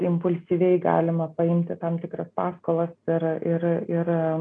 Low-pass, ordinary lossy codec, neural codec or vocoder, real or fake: 9.9 kHz; MP3, 96 kbps; none; real